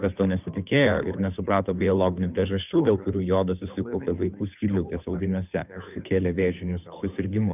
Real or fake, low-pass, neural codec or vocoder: fake; 3.6 kHz; codec, 16 kHz, 2 kbps, FunCodec, trained on Chinese and English, 25 frames a second